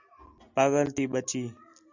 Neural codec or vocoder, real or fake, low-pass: none; real; 7.2 kHz